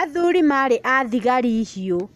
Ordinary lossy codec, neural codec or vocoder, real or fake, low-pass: none; none; real; 14.4 kHz